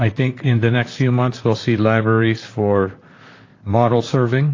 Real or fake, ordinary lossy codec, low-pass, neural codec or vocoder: fake; AAC, 32 kbps; 7.2 kHz; vocoder, 44.1 kHz, 128 mel bands, Pupu-Vocoder